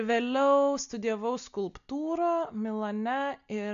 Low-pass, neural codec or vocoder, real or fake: 7.2 kHz; none; real